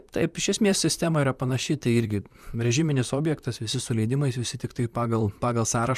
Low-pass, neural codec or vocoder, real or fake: 14.4 kHz; vocoder, 44.1 kHz, 128 mel bands, Pupu-Vocoder; fake